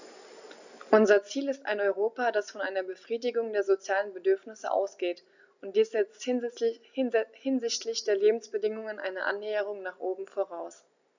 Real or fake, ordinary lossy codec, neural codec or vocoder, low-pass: real; none; none; 7.2 kHz